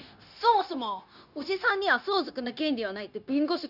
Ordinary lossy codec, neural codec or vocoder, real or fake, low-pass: none; codec, 16 kHz, 0.9 kbps, LongCat-Audio-Codec; fake; 5.4 kHz